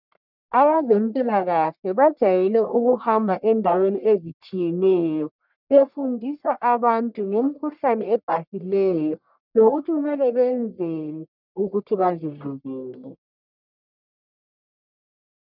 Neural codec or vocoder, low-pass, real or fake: codec, 44.1 kHz, 1.7 kbps, Pupu-Codec; 5.4 kHz; fake